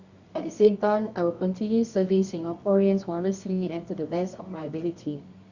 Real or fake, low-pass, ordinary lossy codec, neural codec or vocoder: fake; 7.2 kHz; Opus, 64 kbps; codec, 24 kHz, 0.9 kbps, WavTokenizer, medium music audio release